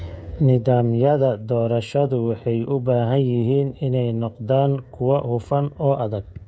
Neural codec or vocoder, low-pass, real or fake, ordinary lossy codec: codec, 16 kHz, 16 kbps, FreqCodec, smaller model; none; fake; none